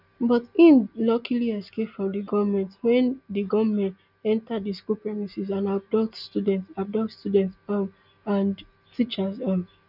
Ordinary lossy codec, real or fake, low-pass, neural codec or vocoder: none; real; 5.4 kHz; none